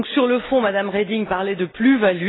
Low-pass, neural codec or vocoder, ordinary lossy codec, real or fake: 7.2 kHz; none; AAC, 16 kbps; real